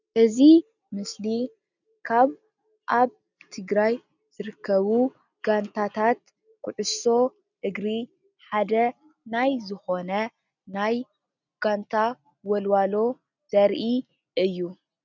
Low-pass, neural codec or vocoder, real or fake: 7.2 kHz; none; real